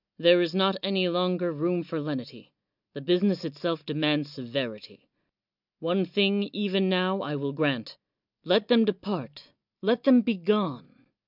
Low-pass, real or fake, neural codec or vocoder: 5.4 kHz; real; none